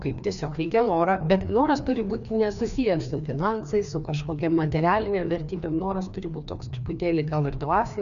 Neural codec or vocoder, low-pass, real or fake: codec, 16 kHz, 2 kbps, FreqCodec, larger model; 7.2 kHz; fake